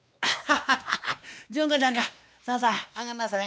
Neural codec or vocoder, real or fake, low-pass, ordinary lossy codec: codec, 16 kHz, 2 kbps, X-Codec, WavLM features, trained on Multilingual LibriSpeech; fake; none; none